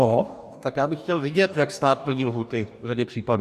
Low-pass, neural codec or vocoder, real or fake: 14.4 kHz; codec, 44.1 kHz, 2.6 kbps, DAC; fake